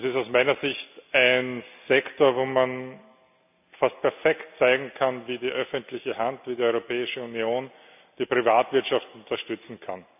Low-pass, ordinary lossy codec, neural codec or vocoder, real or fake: 3.6 kHz; none; none; real